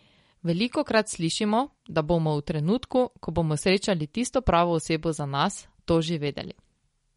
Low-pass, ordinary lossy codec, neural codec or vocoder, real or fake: 10.8 kHz; MP3, 48 kbps; none; real